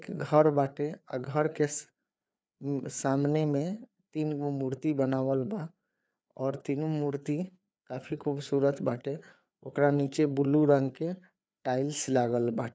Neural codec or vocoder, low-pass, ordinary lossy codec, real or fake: codec, 16 kHz, 4 kbps, FreqCodec, larger model; none; none; fake